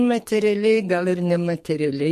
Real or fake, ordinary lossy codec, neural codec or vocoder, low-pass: fake; MP3, 64 kbps; codec, 44.1 kHz, 2.6 kbps, SNAC; 14.4 kHz